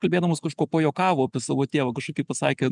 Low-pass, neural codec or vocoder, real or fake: 10.8 kHz; none; real